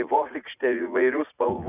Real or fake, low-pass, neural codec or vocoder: fake; 3.6 kHz; vocoder, 44.1 kHz, 80 mel bands, Vocos